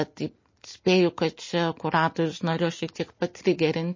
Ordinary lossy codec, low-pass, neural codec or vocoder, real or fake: MP3, 32 kbps; 7.2 kHz; none; real